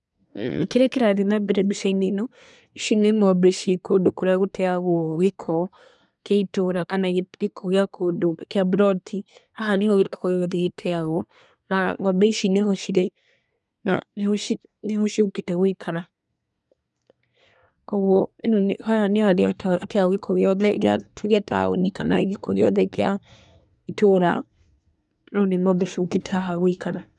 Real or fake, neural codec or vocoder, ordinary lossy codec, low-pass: fake; codec, 24 kHz, 1 kbps, SNAC; none; 10.8 kHz